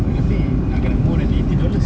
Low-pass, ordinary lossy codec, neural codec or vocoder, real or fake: none; none; none; real